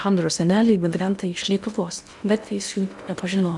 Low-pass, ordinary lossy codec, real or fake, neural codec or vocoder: 10.8 kHz; MP3, 96 kbps; fake; codec, 16 kHz in and 24 kHz out, 0.6 kbps, FocalCodec, streaming, 2048 codes